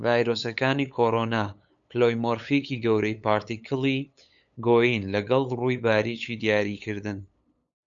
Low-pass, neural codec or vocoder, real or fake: 7.2 kHz; codec, 16 kHz, 8 kbps, FunCodec, trained on LibriTTS, 25 frames a second; fake